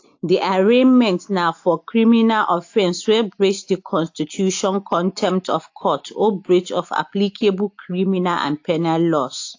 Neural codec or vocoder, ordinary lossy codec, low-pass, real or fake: none; AAC, 48 kbps; 7.2 kHz; real